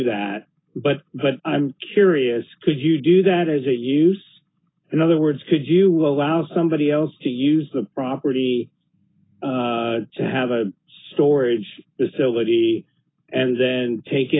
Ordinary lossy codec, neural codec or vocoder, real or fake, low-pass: AAC, 16 kbps; none; real; 7.2 kHz